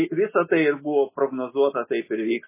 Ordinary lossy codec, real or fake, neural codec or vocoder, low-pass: MP3, 16 kbps; real; none; 3.6 kHz